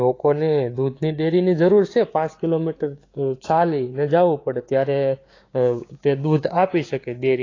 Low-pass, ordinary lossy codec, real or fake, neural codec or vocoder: 7.2 kHz; AAC, 32 kbps; fake; codec, 16 kHz, 6 kbps, DAC